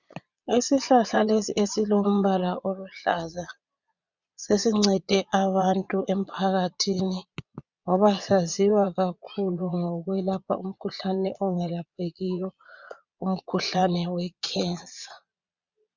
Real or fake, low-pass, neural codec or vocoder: fake; 7.2 kHz; vocoder, 22.05 kHz, 80 mel bands, Vocos